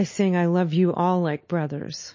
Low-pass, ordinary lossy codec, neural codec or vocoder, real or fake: 7.2 kHz; MP3, 32 kbps; none; real